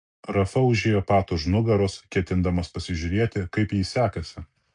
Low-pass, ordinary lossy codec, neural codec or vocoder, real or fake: 9.9 kHz; AAC, 64 kbps; none; real